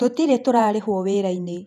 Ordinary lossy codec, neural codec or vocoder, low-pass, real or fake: none; vocoder, 48 kHz, 128 mel bands, Vocos; 14.4 kHz; fake